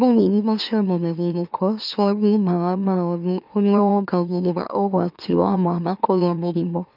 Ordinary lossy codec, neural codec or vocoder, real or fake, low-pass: none; autoencoder, 44.1 kHz, a latent of 192 numbers a frame, MeloTTS; fake; 5.4 kHz